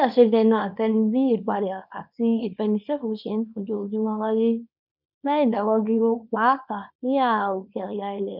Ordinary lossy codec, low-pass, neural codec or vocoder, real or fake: none; 5.4 kHz; codec, 24 kHz, 0.9 kbps, WavTokenizer, small release; fake